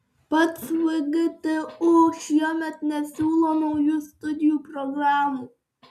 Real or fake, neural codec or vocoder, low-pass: real; none; 14.4 kHz